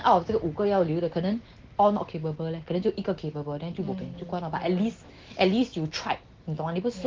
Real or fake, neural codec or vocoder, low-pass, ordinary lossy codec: real; none; 7.2 kHz; Opus, 16 kbps